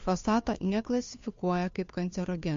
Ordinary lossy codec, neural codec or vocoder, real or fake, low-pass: MP3, 48 kbps; codec, 16 kHz, 2 kbps, FunCodec, trained on Chinese and English, 25 frames a second; fake; 7.2 kHz